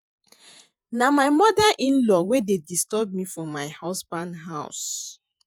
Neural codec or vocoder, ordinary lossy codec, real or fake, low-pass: vocoder, 48 kHz, 128 mel bands, Vocos; none; fake; 19.8 kHz